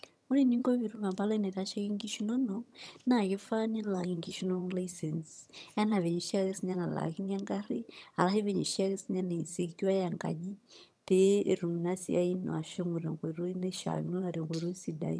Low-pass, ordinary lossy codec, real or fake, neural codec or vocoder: none; none; fake; vocoder, 22.05 kHz, 80 mel bands, HiFi-GAN